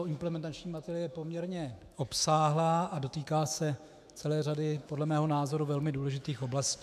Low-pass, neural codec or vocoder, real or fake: 14.4 kHz; autoencoder, 48 kHz, 128 numbers a frame, DAC-VAE, trained on Japanese speech; fake